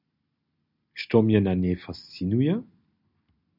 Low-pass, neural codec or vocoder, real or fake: 5.4 kHz; none; real